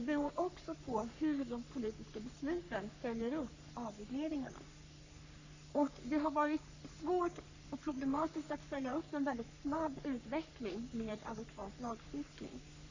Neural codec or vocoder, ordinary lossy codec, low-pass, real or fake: codec, 44.1 kHz, 3.4 kbps, Pupu-Codec; AAC, 48 kbps; 7.2 kHz; fake